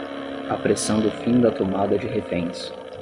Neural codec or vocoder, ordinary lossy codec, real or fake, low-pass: none; Opus, 64 kbps; real; 10.8 kHz